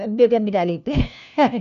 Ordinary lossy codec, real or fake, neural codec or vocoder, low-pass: none; fake; codec, 16 kHz, 1 kbps, FunCodec, trained on LibriTTS, 50 frames a second; 7.2 kHz